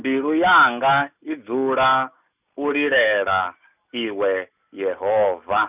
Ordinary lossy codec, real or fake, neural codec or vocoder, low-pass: none; real; none; 3.6 kHz